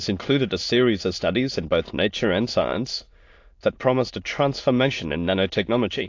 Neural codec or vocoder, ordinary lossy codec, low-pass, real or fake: autoencoder, 22.05 kHz, a latent of 192 numbers a frame, VITS, trained on many speakers; AAC, 48 kbps; 7.2 kHz; fake